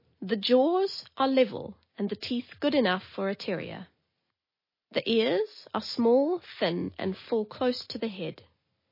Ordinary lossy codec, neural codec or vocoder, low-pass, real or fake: MP3, 24 kbps; none; 5.4 kHz; real